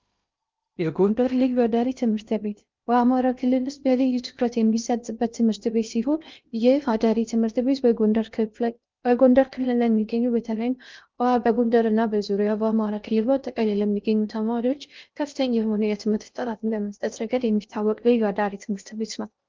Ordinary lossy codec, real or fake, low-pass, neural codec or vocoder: Opus, 24 kbps; fake; 7.2 kHz; codec, 16 kHz in and 24 kHz out, 0.6 kbps, FocalCodec, streaming, 2048 codes